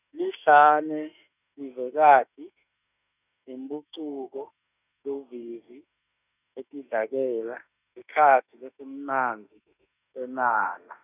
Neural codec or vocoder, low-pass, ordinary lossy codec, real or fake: autoencoder, 48 kHz, 32 numbers a frame, DAC-VAE, trained on Japanese speech; 3.6 kHz; none; fake